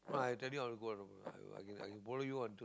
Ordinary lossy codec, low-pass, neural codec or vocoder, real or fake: none; none; none; real